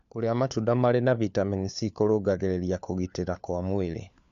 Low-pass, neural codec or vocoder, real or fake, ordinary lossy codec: 7.2 kHz; codec, 16 kHz, 4 kbps, FunCodec, trained on LibriTTS, 50 frames a second; fake; none